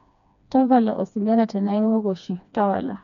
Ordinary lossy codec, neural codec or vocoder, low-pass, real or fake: none; codec, 16 kHz, 2 kbps, FreqCodec, smaller model; 7.2 kHz; fake